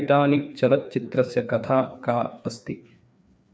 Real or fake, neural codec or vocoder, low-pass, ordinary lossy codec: fake; codec, 16 kHz, 2 kbps, FreqCodec, larger model; none; none